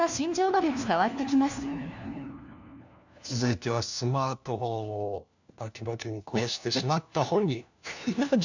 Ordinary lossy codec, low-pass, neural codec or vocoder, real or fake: none; 7.2 kHz; codec, 16 kHz, 1 kbps, FunCodec, trained on LibriTTS, 50 frames a second; fake